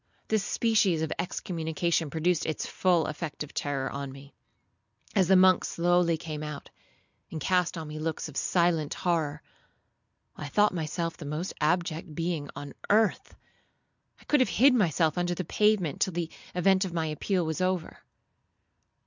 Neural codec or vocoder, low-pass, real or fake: none; 7.2 kHz; real